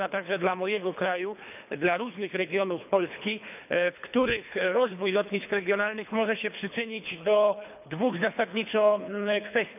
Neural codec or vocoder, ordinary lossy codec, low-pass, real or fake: codec, 24 kHz, 3 kbps, HILCodec; none; 3.6 kHz; fake